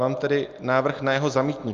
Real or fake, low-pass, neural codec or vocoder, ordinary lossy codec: real; 7.2 kHz; none; Opus, 24 kbps